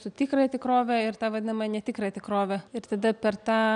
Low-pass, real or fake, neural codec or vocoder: 9.9 kHz; real; none